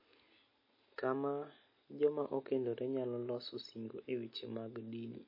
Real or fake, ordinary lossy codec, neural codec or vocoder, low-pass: real; MP3, 24 kbps; none; 5.4 kHz